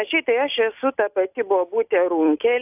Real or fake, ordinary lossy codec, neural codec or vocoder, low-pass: real; AAC, 32 kbps; none; 3.6 kHz